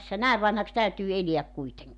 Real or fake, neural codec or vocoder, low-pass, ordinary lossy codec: real; none; 10.8 kHz; none